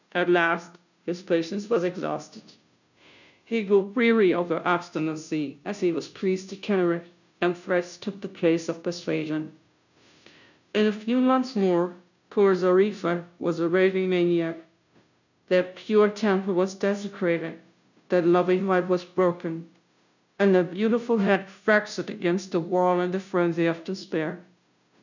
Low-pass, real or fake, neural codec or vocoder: 7.2 kHz; fake; codec, 16 kHz, 0.5 kbps, FunCodec, trained on Chinese and English, 25 frames a second